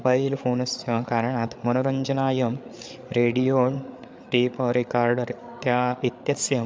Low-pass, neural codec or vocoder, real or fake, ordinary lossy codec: none; codec, 16 kHz, 8 kbps, FreqCodec, larger model; fake; none